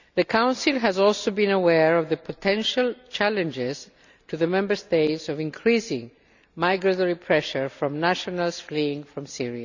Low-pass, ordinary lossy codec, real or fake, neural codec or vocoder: 7.2 kHz; none; real; none